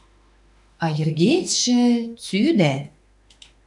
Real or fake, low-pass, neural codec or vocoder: fake; 10.8 kHz; autoencoder, 48 kHz, 32 numbers a frame, DAC-VAE, trained on Japanese speech